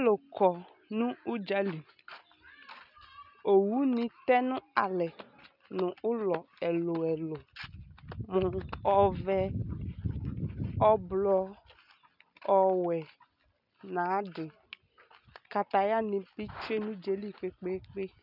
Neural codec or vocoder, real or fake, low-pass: none; real; 5.4 kHz